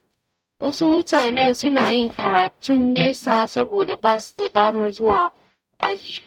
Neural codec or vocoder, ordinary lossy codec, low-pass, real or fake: codec, 44.1 kHz, 0.9 kbps, DAC; none; 19.8 kHz; fake